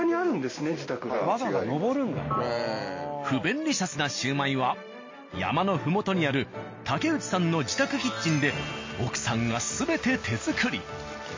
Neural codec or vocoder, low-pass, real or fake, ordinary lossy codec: none; 7.2 kHz; real; MP3, 32 kbps